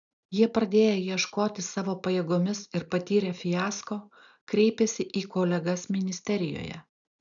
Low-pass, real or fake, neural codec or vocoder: 7.2 kHz; real; none